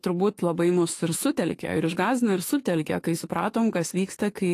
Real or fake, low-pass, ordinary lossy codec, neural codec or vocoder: fake; 14.4 kHz; AAC, 48 kbps; autoencoder, 48 kHz, 32 numbers a frame, DAC-VAE, trained on Japanese speech